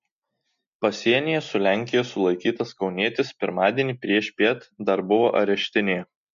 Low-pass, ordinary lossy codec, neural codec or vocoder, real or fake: 7.2 kHz; MP3, 48 kbps; none; real